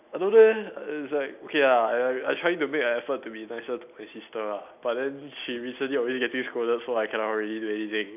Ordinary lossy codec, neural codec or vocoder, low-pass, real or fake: none; none; 3.6 kHz; real